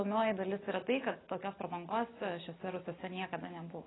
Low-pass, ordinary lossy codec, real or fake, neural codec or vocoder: 7.2 kHz; AAC, 16 kbps; real; none